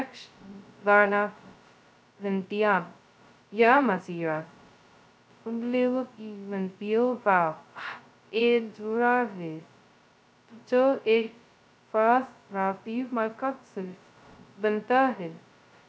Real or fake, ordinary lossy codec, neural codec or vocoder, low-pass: fake; none; codec, 16 kHz, 0.2 kbps, FocalCodec; none